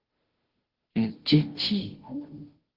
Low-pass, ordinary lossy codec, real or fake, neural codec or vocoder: 5.4 kHz; Opus, 16 kbps; fake; codec, 16 kHz, 0.5 kbps, FunCodec, trained on Chinese and English, 25 frames a second